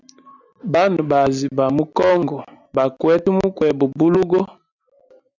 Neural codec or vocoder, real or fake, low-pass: none; real; 7.2 kHz